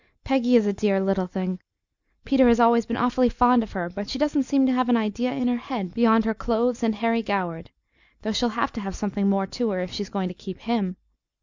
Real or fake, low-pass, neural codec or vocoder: real; 7.2 kHz; none